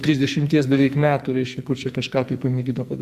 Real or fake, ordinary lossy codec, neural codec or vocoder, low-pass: fake; Opus, 64 kbps; codec, 44.1 kHz, 2.6 kbps, SNAC; 14.4 kHz